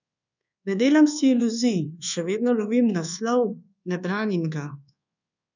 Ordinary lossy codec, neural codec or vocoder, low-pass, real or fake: none; codec, 24 kHz, 1.2 kbps, DualCodec; 7.2 kHz; fake